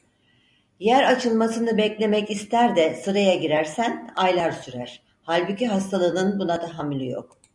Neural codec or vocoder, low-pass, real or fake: none; 10.8 kHz; real